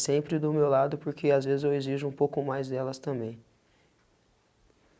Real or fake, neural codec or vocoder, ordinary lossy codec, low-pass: real; none; none; none